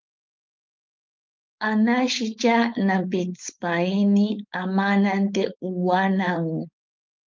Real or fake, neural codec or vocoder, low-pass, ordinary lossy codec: fake; codec, 16 kHz, 4.8 kbps, FACodec; 7.2 kHz; Opus, 24 kbps